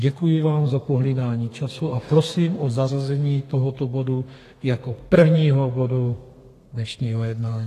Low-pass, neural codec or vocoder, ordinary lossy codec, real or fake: 14.4 kHz; codec, 32 kHz, 1.9 kbps, SNAC; AAC, 48 kbps; fake